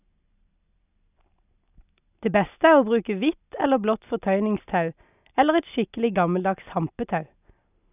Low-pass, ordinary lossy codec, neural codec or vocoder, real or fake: 3.6 kHz; none; none; real